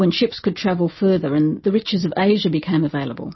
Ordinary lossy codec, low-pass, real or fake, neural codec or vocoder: MP3, 24 kbps; 7.2 kHz; real; none